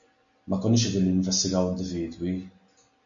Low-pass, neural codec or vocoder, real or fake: 7.2 kHz; none; real